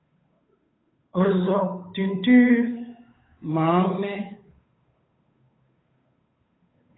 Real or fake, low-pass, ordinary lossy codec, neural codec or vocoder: fake; 7.2 kHz; AAC, 16 kbps; codec, 16 kHz, 8 kbps, FunCodec, trained on Chinese and English, 25 frames a second